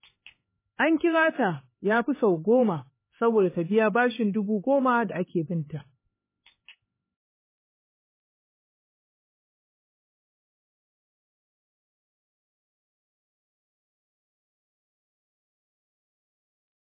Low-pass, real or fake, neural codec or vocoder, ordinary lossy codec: 3.6 kHz; fake; codec, 16 kHz, 4 kbps, X-Codec, HuBERT features, trained on LibriSpeech; MP3, 16 kbps